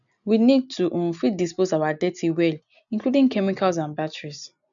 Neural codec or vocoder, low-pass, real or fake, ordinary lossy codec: none; 7.2 kHz; real; none